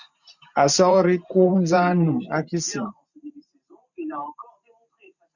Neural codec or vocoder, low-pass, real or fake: vocoder, 44.1 kHz, 128 mel bands every 512 samples, BigVGAN v2; 7.2 kHz; fake